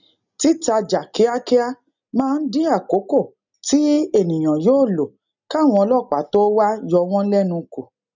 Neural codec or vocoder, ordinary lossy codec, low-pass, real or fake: none; none; 7.2 kHz; real